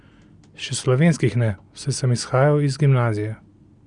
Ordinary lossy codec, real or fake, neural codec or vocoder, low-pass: Opus, 64 kbps; real; none; 9.9 kHz